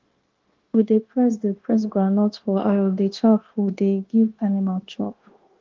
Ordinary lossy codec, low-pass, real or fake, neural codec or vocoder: Opus, 16 kbps; 7.2 kHz; fake; codec, 16 kHz, 0.9 kbps, LongCat-Audio-Codec